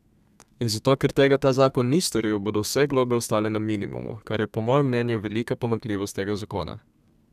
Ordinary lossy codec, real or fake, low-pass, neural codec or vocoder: none; fake; 14.4 kHz; codec, 32 kHz, 1.9 kbps, SNAC